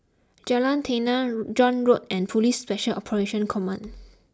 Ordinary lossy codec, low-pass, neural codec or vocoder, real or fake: none; none; none; real